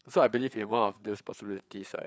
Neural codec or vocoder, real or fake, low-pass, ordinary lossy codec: codec, 16 kHz, 4 kbps, FreqCodec, larger model; fake; none; none